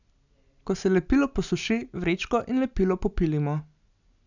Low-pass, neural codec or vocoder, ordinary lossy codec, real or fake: 7.2 kHz; none; none; real